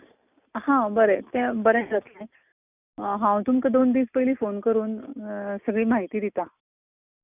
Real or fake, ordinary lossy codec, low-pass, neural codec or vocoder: real; none; 3.6 kHz; none